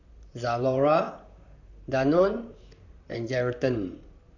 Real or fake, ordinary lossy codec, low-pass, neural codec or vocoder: fake; none; 7.2 kHz; vocoder, 44.1 kHz, 128 mel bands, Pupu-Vocoder